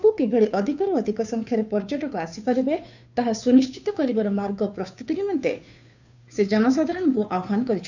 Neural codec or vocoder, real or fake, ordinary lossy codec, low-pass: codec, 16 kHz, 2 kbps, FunCodec, trained on Chinese and English, 25 frames a second; fake; none; 7.2 kHz